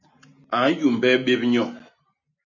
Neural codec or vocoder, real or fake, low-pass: none; real; 7.2 kHz